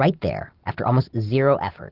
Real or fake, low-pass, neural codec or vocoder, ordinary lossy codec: real; 5.4 kHz; none; Opus, 16 kbps